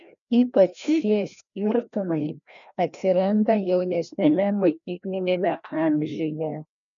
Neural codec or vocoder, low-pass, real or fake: codec, 16 kHz, 1 kbps, FreqCodec, larger model; 7.2 kHz; fake